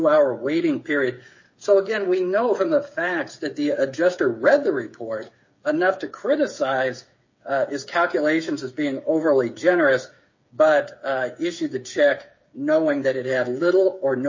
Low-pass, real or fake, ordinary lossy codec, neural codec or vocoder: 7.2 kHz; fake; MP3, 32 kbps; codec, 16 kHz, 8 kbps, FreqCodec, smaller model